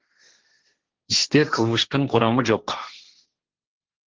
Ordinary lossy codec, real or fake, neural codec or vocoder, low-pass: Opus, 16 kbps; fake; codec, 16 kHz, 1.1 kbps, Voila-Tokenizer; 7.2 kHz